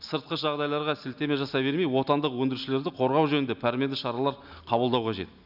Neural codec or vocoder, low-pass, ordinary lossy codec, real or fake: none; 5.4 kHz; none; real